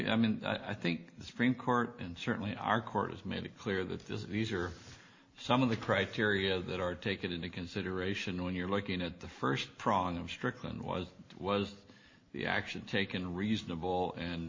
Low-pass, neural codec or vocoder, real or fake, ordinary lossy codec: 7.2 kHz; none; real; MP3, 32 kbps